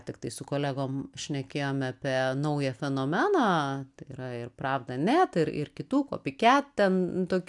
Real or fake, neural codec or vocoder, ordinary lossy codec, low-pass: real; none; MP3, 96 kbps; 10.8 kHz